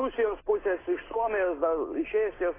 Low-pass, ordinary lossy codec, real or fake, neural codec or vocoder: 3.6 kHz; AAC, 16 kbps; real; none